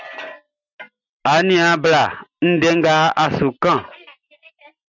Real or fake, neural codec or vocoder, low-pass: real; none; 7.2 kHz